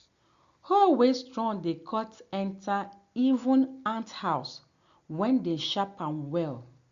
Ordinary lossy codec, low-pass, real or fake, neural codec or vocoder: Opus, 64 kbps; 7.2 kHz; real; none